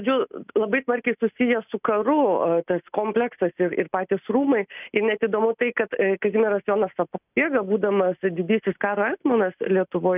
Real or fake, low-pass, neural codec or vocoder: real; 3.6 kHz; none